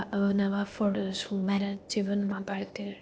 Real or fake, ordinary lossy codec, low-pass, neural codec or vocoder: fake; none; none; codec, 16 kHz, 0.8 kbps, ZipCodec